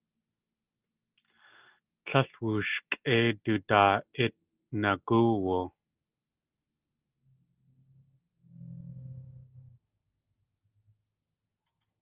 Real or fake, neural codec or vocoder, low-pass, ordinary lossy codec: real; none; 3.6 kHz; Opus, 24 kbps